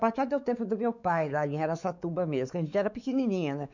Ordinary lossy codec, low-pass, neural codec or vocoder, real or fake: none; 7.2 kHz; codec, 16 kHz, 4 kbps, X-Codec, WavLM features, trained on Multilingual LibriSpeech; fake